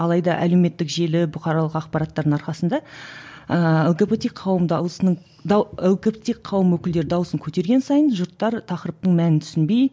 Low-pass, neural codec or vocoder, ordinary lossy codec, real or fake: none; none; none; real